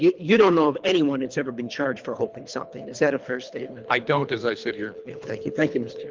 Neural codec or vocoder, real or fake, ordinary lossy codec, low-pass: codec, 24 kHz, 3 kbps, HILCodec; fake; Opus, 24 kbps; 7.2 kHz